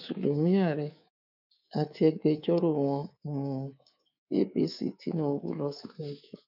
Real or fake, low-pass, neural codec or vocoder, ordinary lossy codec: fake; 5.4 kHz; codec, 24 kHz, 3.1 kbps, DualCodec; none